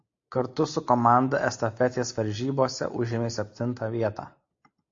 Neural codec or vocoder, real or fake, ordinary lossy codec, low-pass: none; real; AAC, 48 kbps; 7.2 kHz